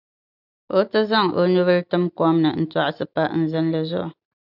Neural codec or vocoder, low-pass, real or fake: none; 5.4 kHz; real